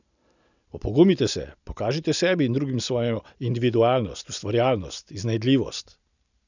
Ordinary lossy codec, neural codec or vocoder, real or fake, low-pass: none; none; real; 7.2 kHz